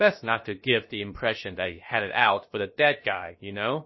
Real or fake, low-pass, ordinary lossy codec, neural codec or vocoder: fake; 7.2 kHz; MP3, 24 kbps; codec, 16 kHz, about 1 kbps, DyCAST, with the encoder's durations